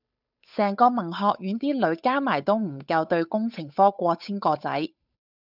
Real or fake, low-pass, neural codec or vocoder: fake; 5.4 kHz; codec, 16 kHz, 8 kbps, FunCodec, trained on Chinese and English, 25 frames a second